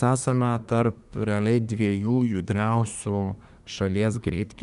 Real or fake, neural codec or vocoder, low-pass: fake; codec, 24 kHz, 1 kbps, SNAC; 10.8 kHz